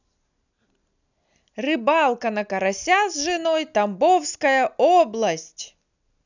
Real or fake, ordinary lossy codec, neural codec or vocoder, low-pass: real; none; none; 7.2 kHz